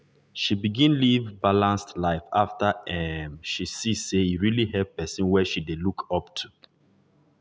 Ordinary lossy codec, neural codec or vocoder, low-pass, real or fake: none; none; none; real